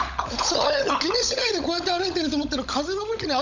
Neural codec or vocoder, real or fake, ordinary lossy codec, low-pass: codec, 16 kHz, 16 kbps, FunCodec, trained on LibriTTS, 50 frames a second; fake; none; 7.2 kHz